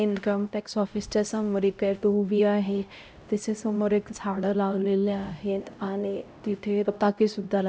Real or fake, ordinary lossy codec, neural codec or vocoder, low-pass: fake; none; codec, 16 kHz, 0.5 kbps, X-Codec, HuBERT features, trained on LibriSpeech; none